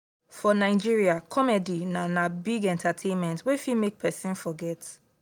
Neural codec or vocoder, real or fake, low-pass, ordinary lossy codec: none; real; 19.8 kHz; none